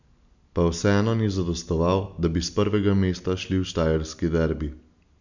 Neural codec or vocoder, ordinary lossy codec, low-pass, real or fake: none; none; 7.2 kHz; real